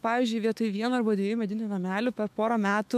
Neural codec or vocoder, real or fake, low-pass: autoencoder, 48 kHz, 128 numbers a frame, DAC-VAE, trained on Japanese speech; fake; 14.4 kHz